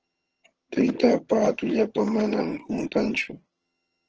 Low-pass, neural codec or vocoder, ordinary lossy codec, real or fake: 7.2 kHz; vocoder, 22.05 kHz, 80 mel bands, HiFi-GAN; Opus, 16 kbps; fake